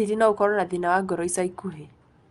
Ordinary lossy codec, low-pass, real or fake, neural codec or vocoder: Opus, 32 kbps; 10.8 kHz; real; none